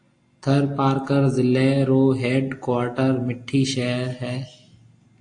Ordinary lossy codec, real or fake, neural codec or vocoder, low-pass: AAC, 48 kbps; real; none; 9.9 kHz